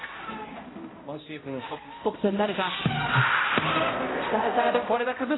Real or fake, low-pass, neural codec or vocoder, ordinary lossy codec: fake; 7.2 kHz; codec, 16 kHz, 0.5 kbps, X-Codec, HuBERT features, trained on balanced general audio; AAC, 16 kbps